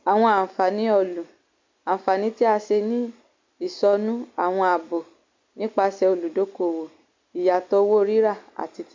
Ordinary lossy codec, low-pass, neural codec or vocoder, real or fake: MP3, 48 kbps; 7.2 kHz; none; real